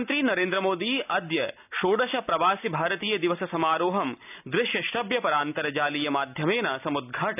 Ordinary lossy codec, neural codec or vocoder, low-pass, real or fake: none; none; 3.6 kHz; real